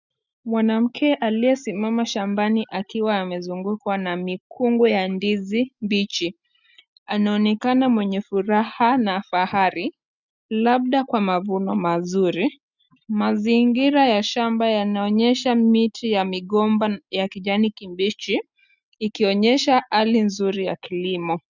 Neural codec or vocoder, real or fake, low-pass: none; real; 7.2 kHz